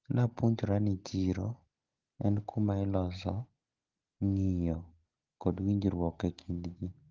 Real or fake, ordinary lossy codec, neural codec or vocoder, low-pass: real; Opus, 16 kbps; none; 7.2 kHz